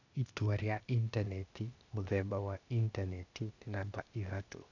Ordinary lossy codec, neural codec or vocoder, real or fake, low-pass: none; codec, 16 kHz, 0.8 kbps, ZipCodec; fake; 7.2 kHz